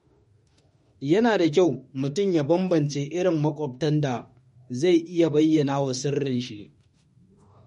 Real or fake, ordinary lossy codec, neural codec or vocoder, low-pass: fake; MP3, 48 kbps; autoencoder, 48 kHz, 32 numbers a frame, DAC-VAE, trained on Japanese speech; 19.8 kHz